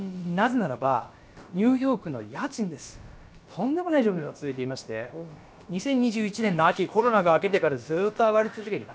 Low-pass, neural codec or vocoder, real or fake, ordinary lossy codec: none; codec, 16 kHz, about 1 kbps, DyCAST, with the encoder's durations; fake; none